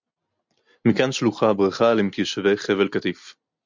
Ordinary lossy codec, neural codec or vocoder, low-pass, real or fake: MP3, 64 kbps; none; 7.2 kHz; real